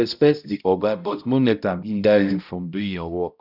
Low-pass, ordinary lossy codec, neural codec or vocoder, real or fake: 5.4 kHz; none; codec, 16 kHz, 0.5 kbps, X-Codec, HuBERT features, trained on balanced general audio; fake